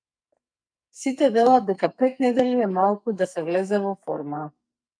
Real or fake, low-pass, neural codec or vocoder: fake; 9.9 kHz; codec, 44.1 kHz, 2.6 kbps, SNAC